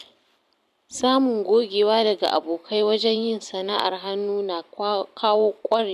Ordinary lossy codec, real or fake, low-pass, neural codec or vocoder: none; fake; 14.4 kHz; vocoder, 44.1 kHz, 128 mel bands every 512 samples, BigVGAN v2